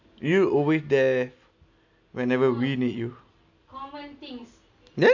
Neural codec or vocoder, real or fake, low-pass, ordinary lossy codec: none; real; 7.2 kHz; none